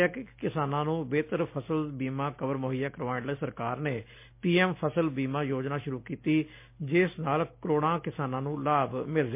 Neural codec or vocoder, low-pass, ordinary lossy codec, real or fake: none; 3.6 kHz; MP3, 24 kbps; real